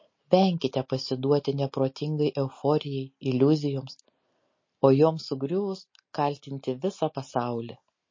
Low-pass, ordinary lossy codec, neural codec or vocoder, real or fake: 7.2 kHz; MP3, 32 kbps; none; real